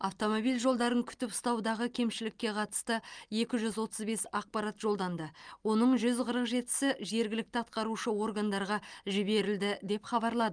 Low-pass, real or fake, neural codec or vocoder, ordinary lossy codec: 9.9 kHz; real; none; Opus, 32 kbps